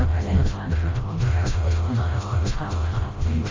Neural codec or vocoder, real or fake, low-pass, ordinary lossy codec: codec, 16 kHz, 0.5 kbps, FreqCodec, smaller model; fake; 7.2 kHz; Opus, 32 kbps